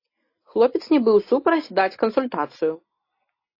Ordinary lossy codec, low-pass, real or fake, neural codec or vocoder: AAC, 32 kbps; 5.4 kHz; real; none